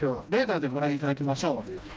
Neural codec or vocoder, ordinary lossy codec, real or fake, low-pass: codec, 16 kHz, 1 kbps, FreqCodec, smaller model; none; fake; none